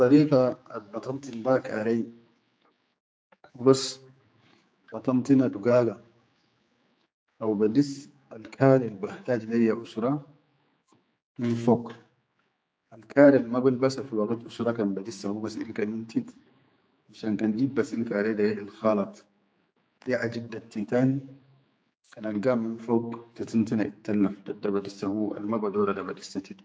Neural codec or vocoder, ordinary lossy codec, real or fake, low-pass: codec, 16 kHz, 4 kbps, X-Codec, HuBERT features, trained on general audio; none; fake; none